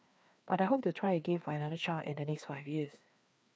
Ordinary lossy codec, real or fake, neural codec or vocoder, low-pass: none; fake; codec, 16 kHz, 2 kbps, FreqCodec, larger model; none